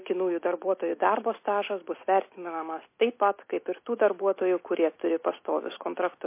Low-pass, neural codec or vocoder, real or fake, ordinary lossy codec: 3.6 kHz; none; real; MP3, 24 kbps